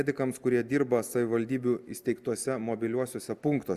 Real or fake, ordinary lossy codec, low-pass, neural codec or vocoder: real; Opus, 64 kbps; 14.4 kHz; none